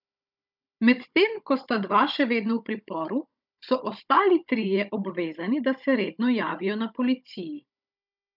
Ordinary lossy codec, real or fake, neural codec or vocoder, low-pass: none; fake; codec, 16 kHz, 16 kbps, FunCodec, trained on Chinese and English, 50 frames a second; 5.4 kHz